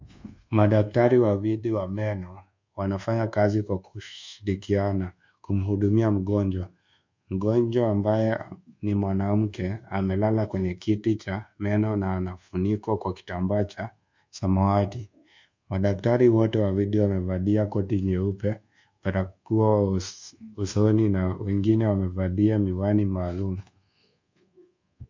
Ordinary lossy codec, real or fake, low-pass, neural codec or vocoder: MP3, 64 kbps; fake; 7.2 kHz; codec, 24 kHz, 1.2 kbps, DualCodec